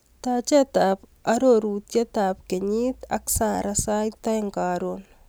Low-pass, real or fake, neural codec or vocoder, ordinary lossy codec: none; real; none; none